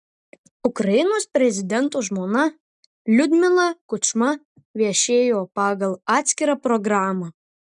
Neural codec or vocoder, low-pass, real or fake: none; 10.8 kHz; real